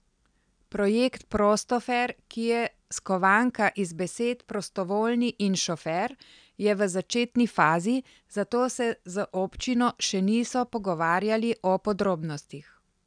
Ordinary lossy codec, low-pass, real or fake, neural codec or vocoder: none; 9.9 kHz; real; none